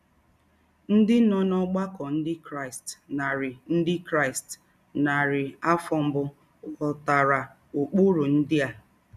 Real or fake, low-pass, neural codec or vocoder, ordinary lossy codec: real; 14.4 kHz; none; none